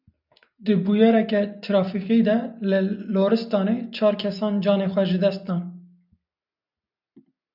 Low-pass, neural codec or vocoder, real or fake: 5.4 kHz; none; real